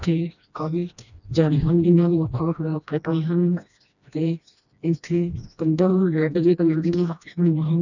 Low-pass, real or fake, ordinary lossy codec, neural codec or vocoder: 7.2 kHz; fake; none; codec, 16 kHz, 1 kbps, FreqCodec, smaller model